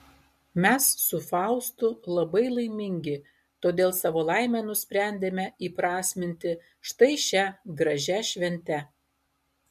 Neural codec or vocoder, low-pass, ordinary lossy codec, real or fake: none; 14.4 kHz; MP3, 64 kbps; real